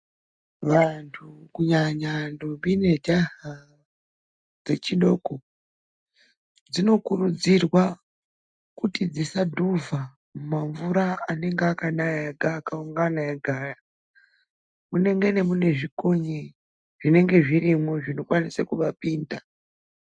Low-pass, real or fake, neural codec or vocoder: 9.9 kHz; real; none